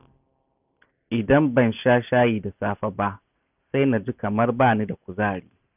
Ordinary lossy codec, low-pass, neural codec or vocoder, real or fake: none; 3.6 kHz; none; real